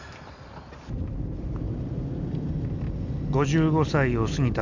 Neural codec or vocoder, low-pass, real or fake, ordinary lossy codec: none; 7.2 kHz; real; none